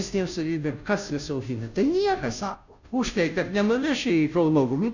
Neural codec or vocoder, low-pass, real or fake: codec, 16 kHz, 0.5 kbps, FunCodec, trained on Chinese and English, 25 frames a second; 7.2 kHz; fake